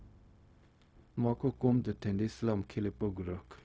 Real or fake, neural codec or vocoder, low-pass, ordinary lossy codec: fake; codec, 16 kHz, 0.4 kbps, LongCat-Audio-Codec; none; none